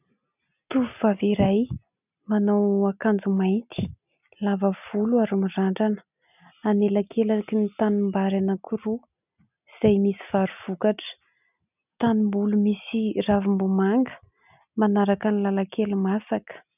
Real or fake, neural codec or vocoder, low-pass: real; none; 3.6 kHz